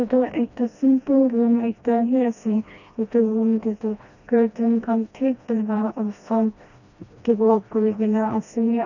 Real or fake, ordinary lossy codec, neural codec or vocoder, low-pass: fake; none; codec, 16 kHz, 1 kbps, FreqCodec, smaller model; 7.2 kHz